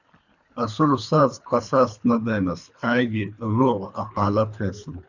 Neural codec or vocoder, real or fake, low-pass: codec, 24 kHz, 3 kbps, HILCodec; fake; 7.2 kHz